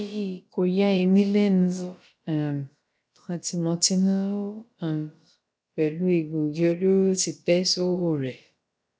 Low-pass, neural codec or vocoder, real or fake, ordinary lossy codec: none; codec, 16 kHz, about 1 kbps, DyCAST, with the encoder's durations; fake; none